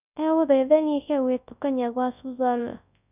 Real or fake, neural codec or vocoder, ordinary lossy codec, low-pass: fake; codec, 24 kHz, 0.9 kbps, WavTokenizer, large speech release; none; 3.6 kHz